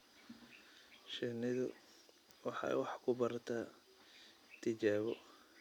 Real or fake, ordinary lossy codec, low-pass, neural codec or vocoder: real; none; 19.8 kHz; none